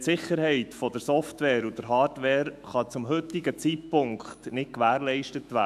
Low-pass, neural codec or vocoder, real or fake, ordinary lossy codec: 14.4 kHz; autoencoder, 48 kHz, 128 numbers a frame, DAC-VAE, trained on Japanese speech; fake; AAC, 96 kbps